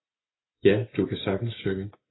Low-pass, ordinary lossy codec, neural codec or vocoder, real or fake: 7.2 kHz; AAC, 16 kbps; none; real